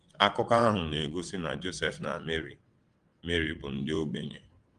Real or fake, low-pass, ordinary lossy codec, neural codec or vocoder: fake; 9.9 kHz; Opus, 24 kbps; vocoder, 22.05 kHz, 80 mel bands, WaveNeXt